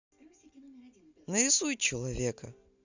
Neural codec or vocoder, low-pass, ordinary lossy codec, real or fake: none; 7.2 kHz; none; real